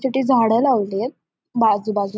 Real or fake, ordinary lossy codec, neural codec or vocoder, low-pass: real; none; none; none